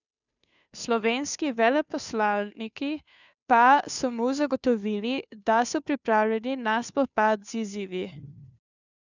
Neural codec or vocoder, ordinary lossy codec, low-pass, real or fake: codec, 16 kHz, 2 kbps, FunCodec, trained on Chinese and English, 25 frames a second; none; 7.2 kHz; fake